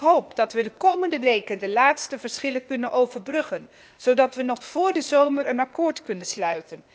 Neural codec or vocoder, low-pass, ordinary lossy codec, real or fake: codec, 16 kHz, 0.8 kbps, ZipCodec; none; none; fake